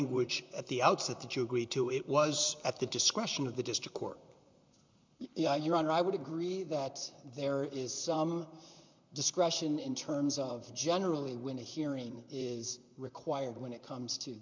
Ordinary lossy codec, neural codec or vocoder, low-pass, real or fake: MP3, 64 kbps; vocoder, 44.1 kHz, 128 mel bands, Pupu-Vocoder; 7.2 kHz; fake